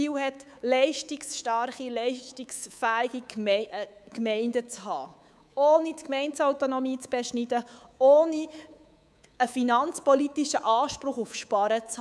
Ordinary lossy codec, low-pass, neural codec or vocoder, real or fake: none; none; codec, 24 kHz, 3.1 kbps, DualCodec; fake